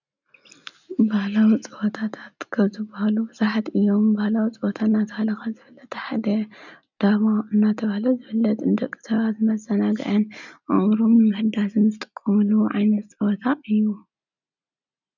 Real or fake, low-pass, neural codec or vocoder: real; 7.2 kHz; none